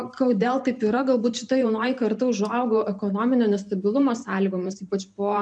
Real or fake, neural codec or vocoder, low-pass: fake; vocoder, 44.1 kHz, 128 mel bands every 512 samples, BigVGAN v2; 9.9 kHz